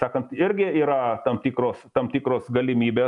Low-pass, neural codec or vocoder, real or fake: 10.8 kHz; none; real